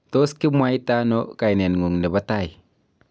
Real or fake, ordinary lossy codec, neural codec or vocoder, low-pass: real; none; none; none